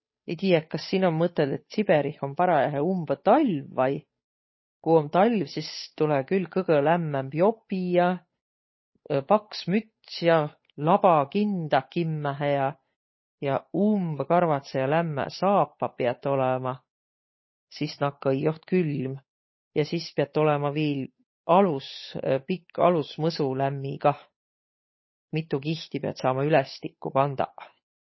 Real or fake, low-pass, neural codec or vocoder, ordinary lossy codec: fake; 7.2 kHz; codec, 16 kHz, 8 kbps, FunCodec, trained on Chinese and English, 25 frames a second; MP3, 24 kbps